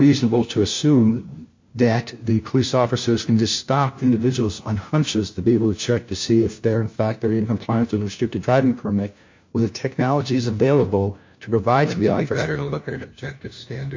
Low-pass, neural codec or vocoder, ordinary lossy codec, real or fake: 7.2 kHz; codec, 16 kHz, 1 kbps, FunCodec, trained on LibriTTS, 50 frames a second; MP3, 48 kbps; fake